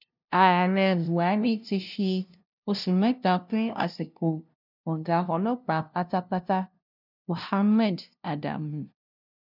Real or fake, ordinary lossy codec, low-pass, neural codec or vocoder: fake; none; 5.4 kHz; codec, 16 kHz, 0.5 kbps, FunCodec, trained on LibriTTS, 25 frames a second